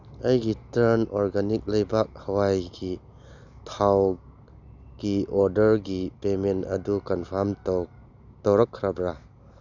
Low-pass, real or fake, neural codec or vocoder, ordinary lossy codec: 7.2 kHz; real; none; none